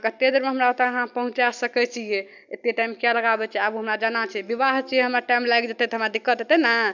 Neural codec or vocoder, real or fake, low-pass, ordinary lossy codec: none; real; 7.2 kHz; none